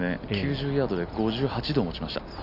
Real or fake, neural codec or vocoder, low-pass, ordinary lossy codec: real; none; 5.4 kHz; none